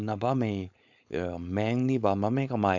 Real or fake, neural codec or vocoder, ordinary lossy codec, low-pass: fake; codec, 16 kHz, 4.8 kbps, FACodec; none; 7.2 kHz